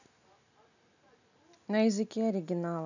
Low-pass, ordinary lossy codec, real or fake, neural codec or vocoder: 7.2 kHz; none; real; none